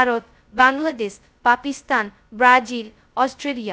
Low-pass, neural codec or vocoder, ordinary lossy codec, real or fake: none; codec, 16 kHz, 0.2 kbps, FocalCodec; none; fake